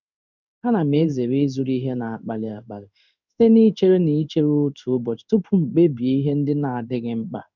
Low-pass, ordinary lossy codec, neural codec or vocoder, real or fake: 7.2 kHz; none; codec, 16 kHz in and 24 kHz out, 1 kbps, XY-Tokenizer; fake